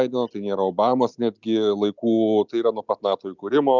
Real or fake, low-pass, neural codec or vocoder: real; 7.2 kHz; none